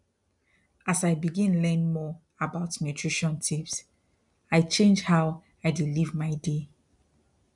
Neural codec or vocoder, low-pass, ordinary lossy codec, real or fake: none; 10.8 kHz; MP3, 96 kbps; real